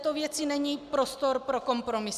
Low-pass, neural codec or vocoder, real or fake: 14.4 kHz; none; real